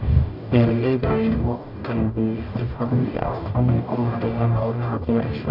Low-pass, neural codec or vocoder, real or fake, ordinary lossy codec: 5.4 kHz; codec, 44.1 kHz, 0.9 kbps, DAC; fake; none